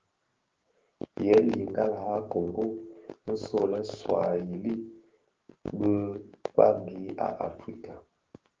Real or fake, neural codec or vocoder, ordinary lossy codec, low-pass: fake; codec, 16 kHz, 6 kbps, DAC; Opus, 16 kbps; 7.2 kHz